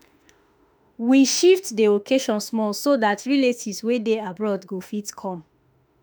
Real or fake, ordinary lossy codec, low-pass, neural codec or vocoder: fake; none; none; autoencoder, 48 kHz, 32 numbers a frame, DAC-VAE, trained on Japanese speech